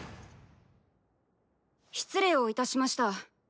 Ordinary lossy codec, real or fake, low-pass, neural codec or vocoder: none; real; none; none